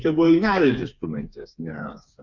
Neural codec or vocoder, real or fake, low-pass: codec, 16 kHz, 4 kbps, FreqCodec, smaller model; fake; 7.2 kHz